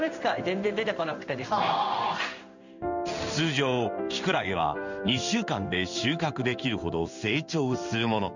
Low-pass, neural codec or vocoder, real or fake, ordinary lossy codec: 7.2 kHz; codec, 16 kHz in and 24 kHz out, 1 kbps, XY-Tokenizer; fake; none